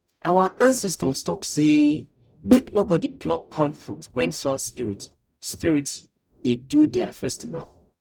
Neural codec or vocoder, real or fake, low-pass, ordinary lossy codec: codec, 44.1 kHz, 0.9 kbps, DAC; fake; 19.8 kHz; none